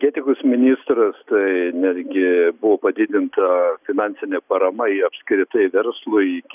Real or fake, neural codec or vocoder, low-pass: real; none; 3.6 kHz